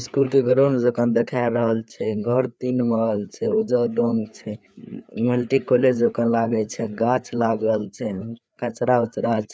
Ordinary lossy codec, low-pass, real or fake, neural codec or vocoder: none; none; fake; codec, 16 kHz, 4 kbps, FreqCodec, larger model